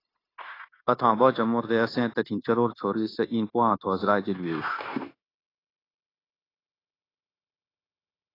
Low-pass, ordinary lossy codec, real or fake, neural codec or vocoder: 5.4 kHz; AAC, 24 kbps; fake; codec, 16 kHz, 0.9 kbps, LongCat-Audio-Codec